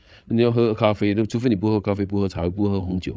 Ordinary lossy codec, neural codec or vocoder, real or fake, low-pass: none; codec, 16 kHz, 8 kbps, FreqCodec, larger model; fake; none